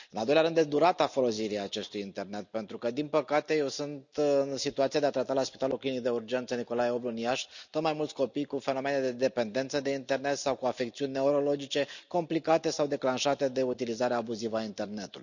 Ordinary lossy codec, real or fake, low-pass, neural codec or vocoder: none; real; 7.2 kHz; none